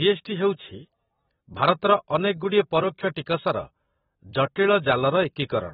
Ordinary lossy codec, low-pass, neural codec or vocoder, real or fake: AAC, 16 kbps; 19.8 kHz; vocoder, 44.1 kHz, 128 mel bands every 256 samples, BigVGAN v2; fake